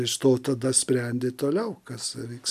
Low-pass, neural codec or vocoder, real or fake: 14.4 kHz; none; real